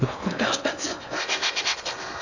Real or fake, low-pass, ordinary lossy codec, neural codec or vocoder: fake; 7.2 kHz; none; codec, 16 kHz in and 24 kHz out, 0.6 kbps, FocalCodec, streaming, 4096 codes